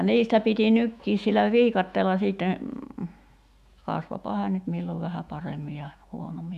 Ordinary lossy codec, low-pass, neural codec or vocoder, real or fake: none; 14.4 kHz; autoencoder, 48 kHz, 128 numbers a frame, DAC-VAE, trained on Japanese speech; fake